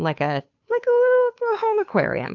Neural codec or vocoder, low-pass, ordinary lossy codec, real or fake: codec, 16 kHz, 2 kbps, FunCodec, trained on Chinese and English, 25 frames a second; 7.2 kHz; AAC, 48 kbps; fake